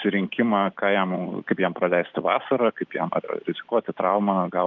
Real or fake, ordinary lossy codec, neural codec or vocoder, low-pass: real; Opus, 24 kbps; none; 7.2 kHz